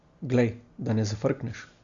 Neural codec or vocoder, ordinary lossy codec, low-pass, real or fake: none; Opus, 64 kbps; 7.2 kHz; real